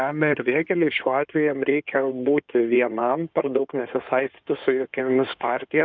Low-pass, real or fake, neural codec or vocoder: 7.2 kHz; fake; codec, 16 kHz in and 24 kHz out, 2.2 kbps, FireRedTTS-2 codec